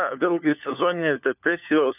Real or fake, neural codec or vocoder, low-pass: fake; codec, 16 kHz, 4 kbps, FunCodec, trained on LibriTTS, 50 frames a second; 3.6 kHz